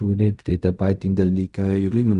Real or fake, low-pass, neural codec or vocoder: fake; 10.8 kHz; codec, 16 kHz in and 24 kHz out, 0.4 kbps, LongCat-Audio-Codec, fine tuned four codebook decoder